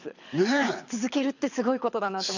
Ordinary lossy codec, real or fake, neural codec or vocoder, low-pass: none; fake; codec, 24 kHz, 6 kbps, HILCodec; 7.2 kHz